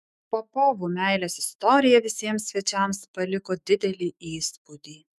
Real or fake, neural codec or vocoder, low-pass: fake; autoencoder, 48 kHz, 128 numbers a frame, DAC-VAE, trained on Japanese speech; 14.4 kHz